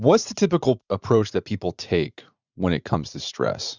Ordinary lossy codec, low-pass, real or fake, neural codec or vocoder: Opus, 64 kbps; 7.2 kHz; fake; vocoder, 44.1 kHz, 80 mel bands, Vocos